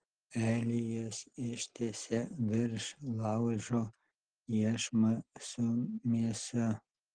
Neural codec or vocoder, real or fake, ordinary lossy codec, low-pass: none; real; Opus, 16 kbps; 9.9 kHz